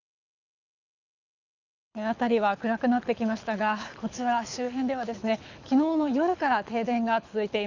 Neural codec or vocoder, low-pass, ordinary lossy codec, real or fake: codec, 24 kHz, 6 kbps, HILCodec; 7.2 kHz; none; fake